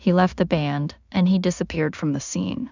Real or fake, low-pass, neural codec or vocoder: fake; 7.2 kHz; codec, 16 kHz in and 24 kHz out, 0.4 kbps, LongCat-Audio-Codec, two codebook decoder